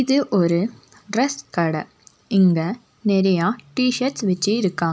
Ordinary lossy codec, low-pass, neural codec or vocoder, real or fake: none; none; none; real